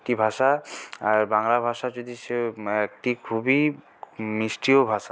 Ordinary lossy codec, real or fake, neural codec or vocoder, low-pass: none; real; none; none